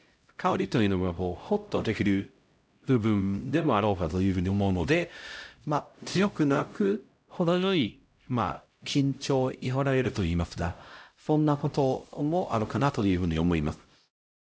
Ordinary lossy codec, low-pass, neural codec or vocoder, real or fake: none; none; codec, 16 kHz, 0.5 kbps, X-Codec, HuBERT features, trained on LibriSpeech; fake